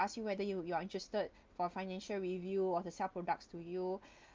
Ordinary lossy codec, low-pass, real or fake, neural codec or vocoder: Opus, 24 kbps; 7.2 kHz; real; none